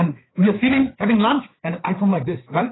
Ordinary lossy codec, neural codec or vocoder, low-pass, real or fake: AAC, 16 kbps; codec, 16 kHz, 4 kbps, FreqCodec, larger model; 7.2 kHz; fake